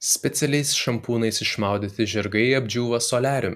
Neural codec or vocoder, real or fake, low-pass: none; real; 14.4 kHz